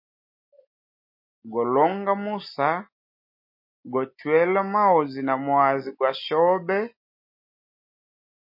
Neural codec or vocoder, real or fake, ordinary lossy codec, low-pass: none; real; MP3, 32 kbps; 5.4 kHz